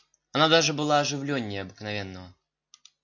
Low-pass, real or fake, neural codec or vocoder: 7.2 kHz; real; none